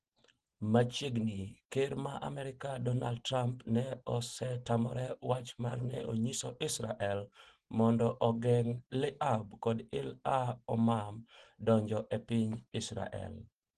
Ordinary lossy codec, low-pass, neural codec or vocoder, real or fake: Opus, 16 kbps; 9.9 kHz; none; real